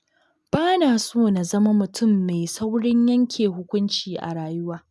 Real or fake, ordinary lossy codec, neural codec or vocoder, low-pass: real; none; none; none